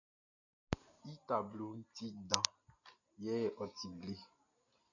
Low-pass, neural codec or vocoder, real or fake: 7.2 kHz; none; real